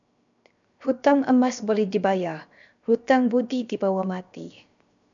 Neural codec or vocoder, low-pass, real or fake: codec, 16 kHz, 0.7 kbps, FocalCodec; 7.2 kHz; fake